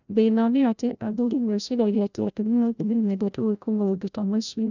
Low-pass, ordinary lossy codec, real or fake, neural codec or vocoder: 7.2 kHz; none; fake; codec, 16 kHz, 0.5 kbps, FreqCodec, larger model